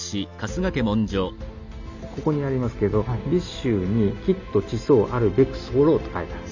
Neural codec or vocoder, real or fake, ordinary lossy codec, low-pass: none; real; none; 7.2 kHz